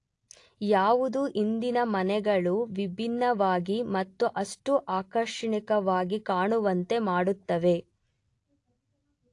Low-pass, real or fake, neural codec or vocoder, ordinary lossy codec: 10.8 kHz; real; none; AAC, 48 kbps